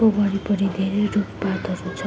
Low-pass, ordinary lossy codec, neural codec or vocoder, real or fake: none; none; none; real